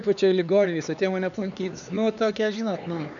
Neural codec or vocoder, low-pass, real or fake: codec, 16 kHz, 4 kbps, X-Codec, HuBERT features, trained on LibriSpeech; 7.2 kHz; fake